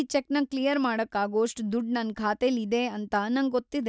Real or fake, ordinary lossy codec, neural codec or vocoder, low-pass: real; none; none; none